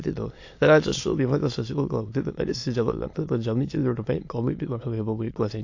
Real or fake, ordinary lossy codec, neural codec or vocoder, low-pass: fake; AAC, 48 kbps; autoencoder, 22.05 kHz, a latent of 192 numbers a frame, VITS, trained on many speakers; 7.2 kHz